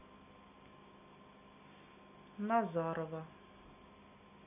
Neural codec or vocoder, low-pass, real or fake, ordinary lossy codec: none; 3.6 kHz; real; none